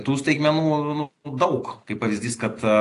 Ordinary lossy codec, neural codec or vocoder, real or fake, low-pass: AAC, 48 kbps; none; real; 10.8 kHz